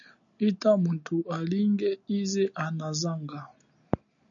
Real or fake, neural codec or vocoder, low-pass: real; none; 7.2 kHz